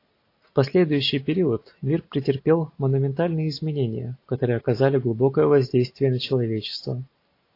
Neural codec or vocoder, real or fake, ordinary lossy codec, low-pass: none; real; AAC, 32 kbps; 5.4 kHz